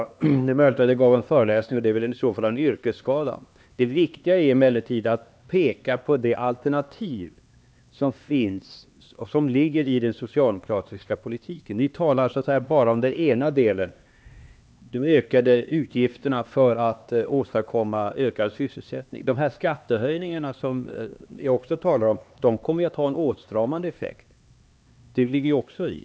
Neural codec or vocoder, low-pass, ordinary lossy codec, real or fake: codec, 16 kHz, 2 kbps, X-Codec, HuBERT features, trained on LibriSpeech; none; none; fake